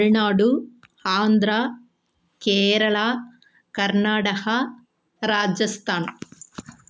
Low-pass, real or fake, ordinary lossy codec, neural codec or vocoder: none; real; none; none